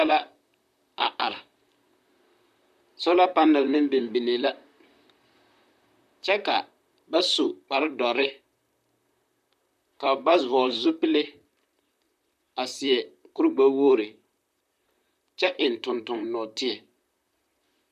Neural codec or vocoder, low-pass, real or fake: vocoder, 44.1 kHz, 128 mel bands, Pupu-Vocoder; 14.4 kHz; fake